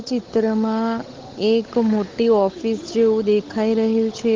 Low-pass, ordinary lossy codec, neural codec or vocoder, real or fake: 7.2 kHz; Opus, 16 kbps; codec, 16 kHz, 8 kbps, FunCodec, trained on Chinese and English, 25 frames a second; fake